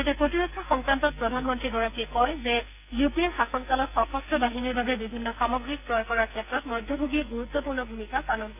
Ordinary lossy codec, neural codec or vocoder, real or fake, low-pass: none; codec, 44.1 kHz, 2.6 kbps, SNAC; fake; 3.6 kHz